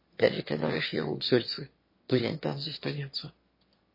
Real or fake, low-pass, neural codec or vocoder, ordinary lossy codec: fake; 5.4 kHz; autoencoder, 22.05 kHz, a latent of 192 numbers a frame, VITS, trained on one speaker; MP3, 24 kbps